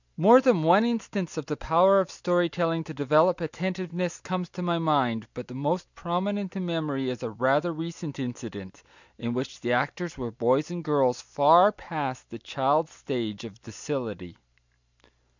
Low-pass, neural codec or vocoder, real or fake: 7.2 kHz; none; real